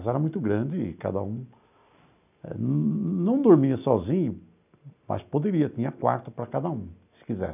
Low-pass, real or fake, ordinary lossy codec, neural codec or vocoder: 3.6 kHz; real; none; none